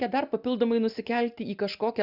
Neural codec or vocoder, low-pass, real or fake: none; 5.4 kHz; real